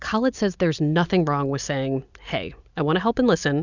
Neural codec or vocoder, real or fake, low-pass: none; real; 7.2 kHz